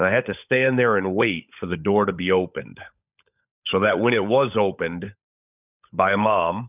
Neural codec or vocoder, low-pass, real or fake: codec, 16 kHz, 16 kbps, FunCodec, trained on LibriTTS, 50 frames a second; 3.6 kHz; fake